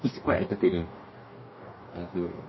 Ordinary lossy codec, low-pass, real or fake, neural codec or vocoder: MP3, 24 kbps; 7.2 kHz; fake; codec, 44.1 kHz, 2.6 kbps, DAC